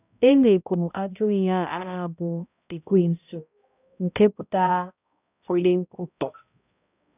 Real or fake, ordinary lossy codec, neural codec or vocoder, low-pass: fake; none; codec, 16 kHz, 0.5 kbps, X-Codec, HuBERT features, trained on balanced general audio; 3.6 kHz